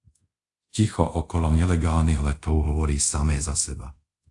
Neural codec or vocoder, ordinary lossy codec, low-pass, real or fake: codec, 24 kHz, 0.5 kbps, DualCodec; AAC, 64 kbps; 10.8 kHz; fake